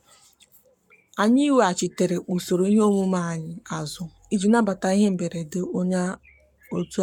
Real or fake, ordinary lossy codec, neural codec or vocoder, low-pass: fake; none; codec, 44.1 kHz, 7.8 kbps, Pupu-Codec; 19.8 kHz